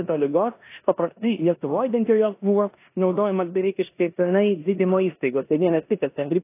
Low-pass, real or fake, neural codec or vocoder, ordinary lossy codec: 3.6 kHz; fake; codec, 16 kHz in and 24 kHz out, 0.9 kbps, LongCat-Audio-Codec, four codebook decoder; AAC, 24 kbps